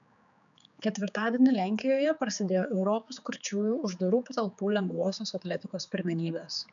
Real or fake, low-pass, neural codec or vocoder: fake; 7.2 kHz; codec, 16 kHz, 4 kbps, X-Codec, HuBERT features, trained on general audio